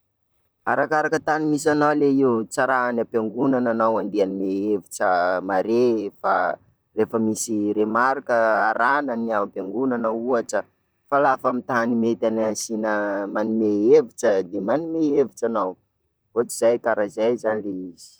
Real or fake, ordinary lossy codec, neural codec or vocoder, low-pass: fake; none; vocoder, 44.1 kHz, 128 mel bands, Pupu-Vocoder; none